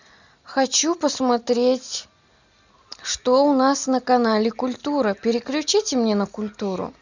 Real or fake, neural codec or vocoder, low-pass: real; none; 7.2 kHz